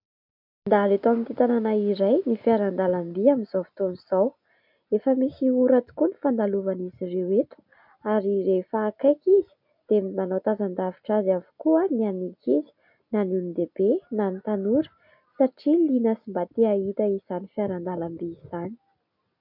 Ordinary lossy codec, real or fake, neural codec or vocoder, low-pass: MP3, 48 kbps; real; none; 5.4 kHz